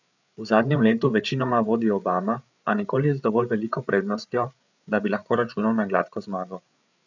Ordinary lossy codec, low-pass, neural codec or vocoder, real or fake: none; 7.2 kHz; codec, 16 kHz, 8 kbps, FreqCodec, larger model; fake